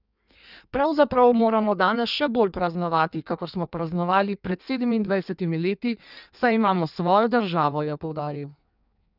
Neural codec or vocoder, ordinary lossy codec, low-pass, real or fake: codec, 16 kHz in and 24 kHz out, 1.1 kbps, FireRedTTS-2 codec; none; 5.4 kHz; fake